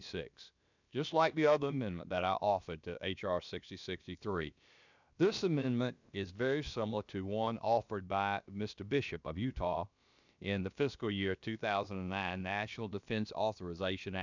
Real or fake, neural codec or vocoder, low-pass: fake; codec, 16 kHz, about 1 kbps, DyCAST, with the encoder's durations; 7.2 kHz